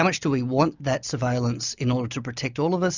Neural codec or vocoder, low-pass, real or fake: none; 7.2 kHz; real